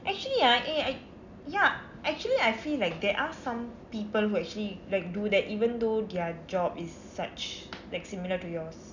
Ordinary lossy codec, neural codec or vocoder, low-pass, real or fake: none; none; 7.2 kHz; real